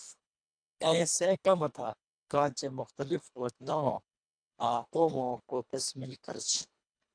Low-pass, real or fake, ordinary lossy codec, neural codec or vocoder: 9.9 kHz; fake; MP3, 96 kbps; codec, 24 kHz, 1.5 kbps, HILCodec